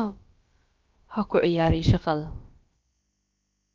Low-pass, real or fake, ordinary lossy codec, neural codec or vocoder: 7.2 kHz; fake; Opus, 24 kbps; codec, 16 kHz, about 1 kbps, DyCAST, with the encoder's durations